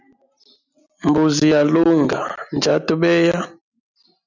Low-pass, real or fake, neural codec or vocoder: 7.2 kHz; real; none